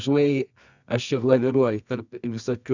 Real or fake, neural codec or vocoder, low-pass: fake; codec, 24 kHz, 0.9 kbps, WavTokenizer, medium music audio release; 7.2 kHz